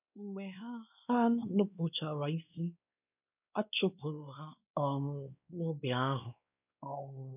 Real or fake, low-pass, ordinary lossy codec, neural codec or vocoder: fake; 3.6 kHz; none; codec, 16 kHz, 2 kbps, X-Codec, WavLM features, trained on Multilingual LibriSpeech